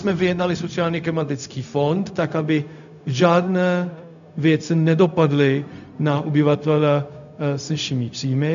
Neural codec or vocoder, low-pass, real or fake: codec, 16 kHz, 0.4 kbps, LongCat-Audio-Codec; 7.2 kHz; fake